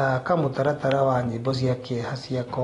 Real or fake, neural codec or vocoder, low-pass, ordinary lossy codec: real; none; 10.8 kHz; AAC, 32 kbps